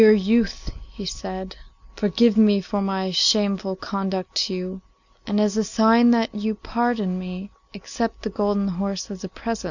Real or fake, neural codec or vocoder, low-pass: real; none; 7.2 kHz